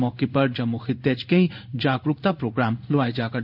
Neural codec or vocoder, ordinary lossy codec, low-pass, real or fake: codec, 16 kHz in and 24 kHz out, 1 kbps, XY-Tokenizer; none; 5.4 kHz; fake